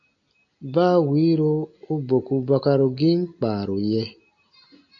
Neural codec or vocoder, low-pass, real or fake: none; 7.2 kHz; real